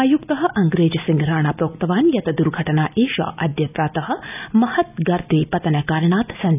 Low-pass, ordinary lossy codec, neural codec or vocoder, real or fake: 3.6 kHz; none; none; real